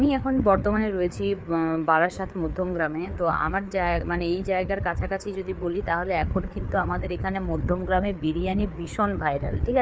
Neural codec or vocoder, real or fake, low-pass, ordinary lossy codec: codec, 16 kHz, 4 kbps, FreqCodec, larger model; fake; none; none